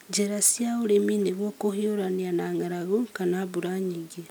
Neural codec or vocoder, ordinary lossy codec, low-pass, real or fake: none; none; none; real